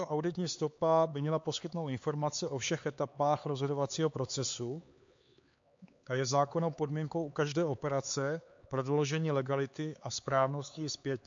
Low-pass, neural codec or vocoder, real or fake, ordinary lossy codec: 7.2 kHz; codec, 16 kHz, 4 kbps, X-Codec, HuBERT features, trained on LibriSpeech; fake; MP3, 48 kbps